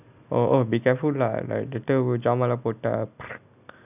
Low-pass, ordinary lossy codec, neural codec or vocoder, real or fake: 3.6 kHz; none; none; real